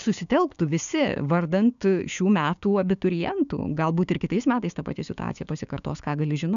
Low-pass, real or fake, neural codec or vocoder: 7.2 kHz; fake; codec, 16 kHz, 6 kbps, DAC